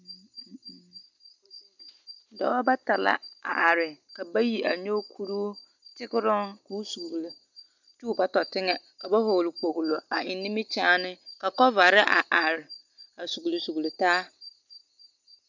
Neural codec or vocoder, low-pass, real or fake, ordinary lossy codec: none; 7.2 kHz; real; MP3, 64 kbps